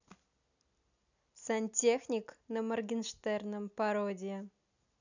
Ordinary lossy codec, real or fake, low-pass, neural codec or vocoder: none; real; 7.2 kHz; none